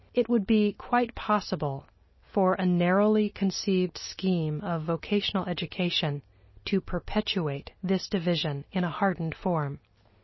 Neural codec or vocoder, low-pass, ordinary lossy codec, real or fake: none; 7.2 kHz; MP3, 24 kbps; real